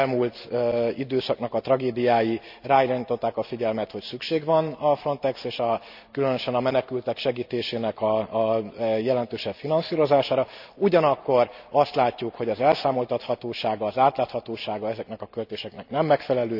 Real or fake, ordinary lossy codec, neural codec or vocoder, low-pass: real; none; none; 5.4 kHz